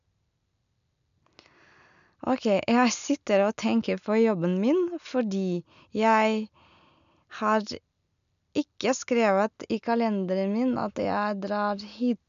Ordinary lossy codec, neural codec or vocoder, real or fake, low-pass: none; none; real; 7.2 kHz